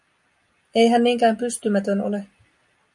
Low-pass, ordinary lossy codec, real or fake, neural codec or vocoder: 10.8 kHz; MP3, 96 kbps; real; none